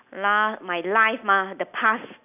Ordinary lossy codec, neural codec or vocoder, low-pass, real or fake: none; none; 3.6 kHz; real